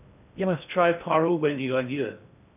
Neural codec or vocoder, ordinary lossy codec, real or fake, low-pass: codec, 16 kHz in and 24 kHz out, 0.6 kbps, FocalCodec, streaming, 2048 codes; none; fake; 3.6 kHz